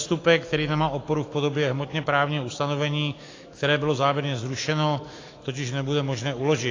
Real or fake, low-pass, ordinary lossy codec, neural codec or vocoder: fake; 7.2 kHz; AAC, 32 kbps; autoencoder, 48 kHz, 128 numbers a frame, DAC-VAE, trained on Japanese speech